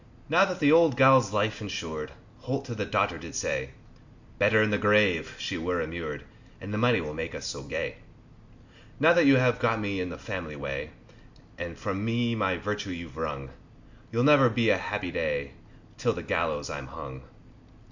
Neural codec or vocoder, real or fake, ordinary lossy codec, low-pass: none; real; MP3, 64 kbps; 7.2 kHz